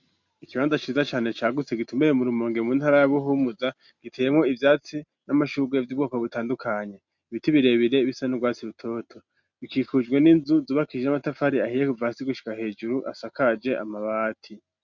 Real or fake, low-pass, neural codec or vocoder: real; 7.2 kHz; none